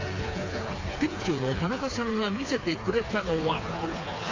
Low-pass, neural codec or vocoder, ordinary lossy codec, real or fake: 7.2 kHz; codec, 16 kHz, 4 kbps, FreqCodec, smaller model; AAC, 32 kbps; fake